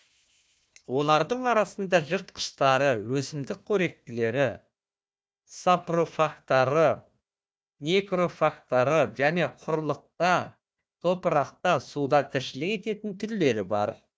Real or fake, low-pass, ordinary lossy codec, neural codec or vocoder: fake; none; none; codec, 16 kHz, 1 kbps, FunCodec, trained on Chinese and English, 50 frames a second